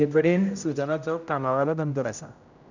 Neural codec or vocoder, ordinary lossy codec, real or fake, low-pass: codec, 16 kHz, 0.5 kbps, X-Codec, HuBERT features, trained on general audio; none; fake; 7.2 kHz